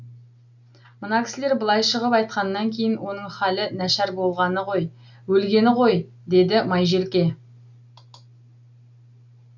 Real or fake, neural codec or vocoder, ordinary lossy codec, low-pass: real; none; none; 7.2 kHz